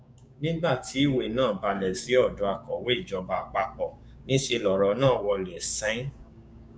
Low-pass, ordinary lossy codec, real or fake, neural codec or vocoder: none; none; fake; codec, 16 kHz, 6 kbps, DAC